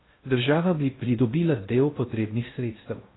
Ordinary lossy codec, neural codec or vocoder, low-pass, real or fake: AAC, 16 kbps; codec, 16 kHz in and 24 kHz out, 0.6 kbps, FocalCodec, streaming, 2048 codes; 7.2 kHz; fake